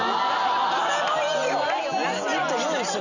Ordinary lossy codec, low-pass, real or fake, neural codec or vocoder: none; 7.2 kHz; real; none